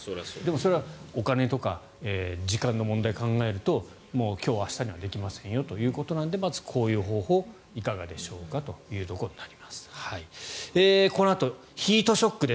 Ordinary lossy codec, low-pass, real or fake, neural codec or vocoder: none; none; real; none